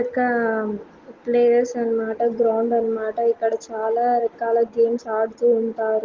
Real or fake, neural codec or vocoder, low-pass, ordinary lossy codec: real; none; 7.2 kHz; Opus, 16 kbps